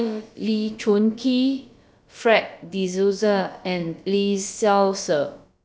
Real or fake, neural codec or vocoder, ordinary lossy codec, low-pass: fake; codec, 16 kHz, about 1 kbps, DyCAST, with the encoder's durations; none; none